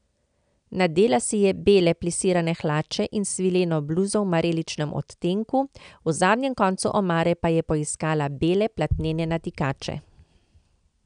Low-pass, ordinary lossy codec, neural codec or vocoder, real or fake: 9.9 kHz; none; none; real